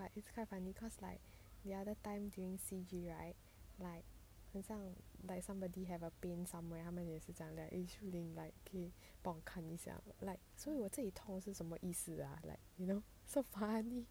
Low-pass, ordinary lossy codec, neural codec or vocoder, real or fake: none; none; none; real